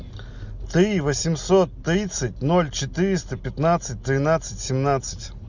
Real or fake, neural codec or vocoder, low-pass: real; none; 7.2 kHz